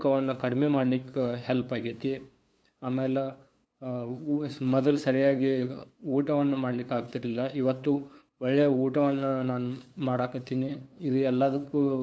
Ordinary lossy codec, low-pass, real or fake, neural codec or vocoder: none; none; fake; codec, 16 kHz, 2 kbps, FunCodec, trained on LibriTTS, 25 frames a second